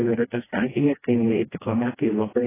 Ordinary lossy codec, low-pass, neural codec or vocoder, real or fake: AAC, 16 kbps; 3.6 kHz; codec, 16 kHz, 1 kbps, FreqCodec, smaller model; fake